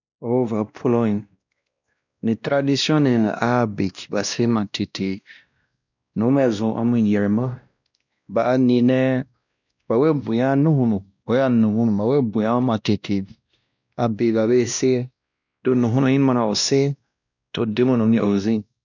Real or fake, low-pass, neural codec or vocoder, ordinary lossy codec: fake; 7.2 kHz; codec, 16 kHz, 1 kbps, X-Codec, WavLM features, trained on Multilingual LibriSpeech; none